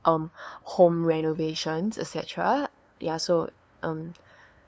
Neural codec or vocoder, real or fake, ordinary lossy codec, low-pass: codec, 16 kHz, 2 kbps, FunCodec, trained on LibriTTS, 25 frames a second; fake; none; none